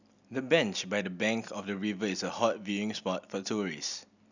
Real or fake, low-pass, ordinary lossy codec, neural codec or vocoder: real; 7.2 kHz; none; none